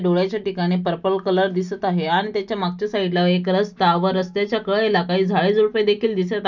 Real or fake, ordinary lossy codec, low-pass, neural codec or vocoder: real; none; 7.2 kHz; none